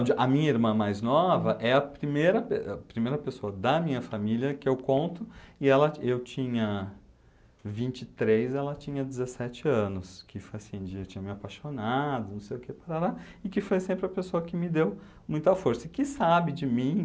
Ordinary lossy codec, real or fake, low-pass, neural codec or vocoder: none; real; none; none